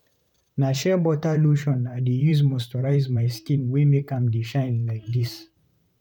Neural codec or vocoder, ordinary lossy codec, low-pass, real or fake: vocoder, 44.1 kHz, 128 mel bands, Pupu-Vocoder; none; 19.8 kHz; fake